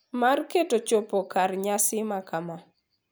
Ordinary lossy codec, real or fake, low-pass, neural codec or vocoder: none; real; none; none